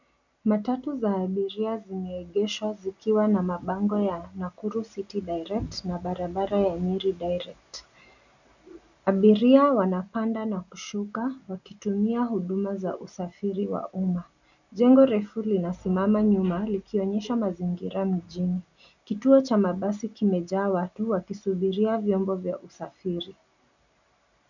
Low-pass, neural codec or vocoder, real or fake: 7.2 kHz; none; real